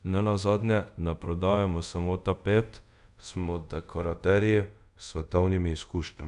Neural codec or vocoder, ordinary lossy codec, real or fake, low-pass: codec, 24 kHz, 0.5 kbps, DualCodec; none; fake; 10.8 kHz